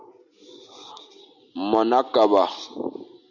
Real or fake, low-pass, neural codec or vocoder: real; 7.2 kHz; none